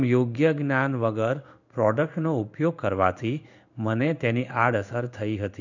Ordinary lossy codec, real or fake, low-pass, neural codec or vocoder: none; fake; 7.2 kHz; codec, 16 kHz in and 24 kHz out, 1 kbps, XY-Tokenizer